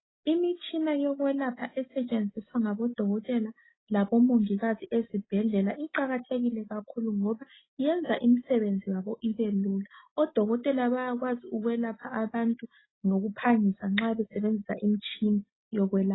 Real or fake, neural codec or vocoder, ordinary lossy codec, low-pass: real; none; AAC, 16 kbps; 7.2 kHz